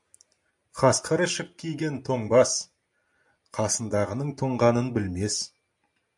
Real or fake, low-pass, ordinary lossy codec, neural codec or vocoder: real; 10.8 kHz; MP3, 96 kbps; none